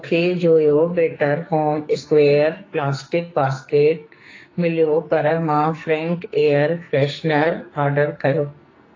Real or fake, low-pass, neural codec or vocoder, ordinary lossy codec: fake; 7.2 kHz; codec, 44.1 kHz, 2.6 kbps, SNAC; AAC, 32 kbps